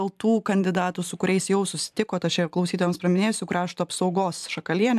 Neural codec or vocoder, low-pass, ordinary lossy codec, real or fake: vocoder, 44.1 kHz, 128 mel bands every 512 samples, BigVGAN v2; 14.4 kHz; AAC, 96 kbps; fake